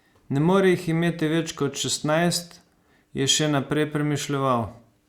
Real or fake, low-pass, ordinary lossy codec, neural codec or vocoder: real; 19.8 kHz; Opus, 64 kbps; none